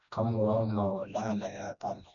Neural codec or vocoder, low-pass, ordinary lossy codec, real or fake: codec, 16 kHz, 1 kbps, FreqCodec, smaller model; 7.2 kHz; none; fake